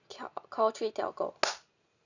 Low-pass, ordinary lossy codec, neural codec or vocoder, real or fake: 7.2 kHz; none; none; real